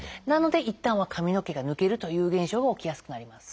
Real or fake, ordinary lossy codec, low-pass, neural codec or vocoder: real; none; none; none